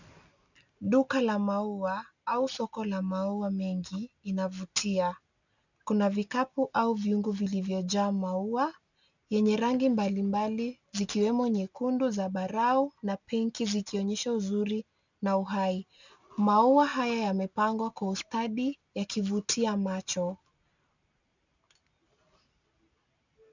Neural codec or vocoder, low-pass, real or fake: none; 7.2 kHz; real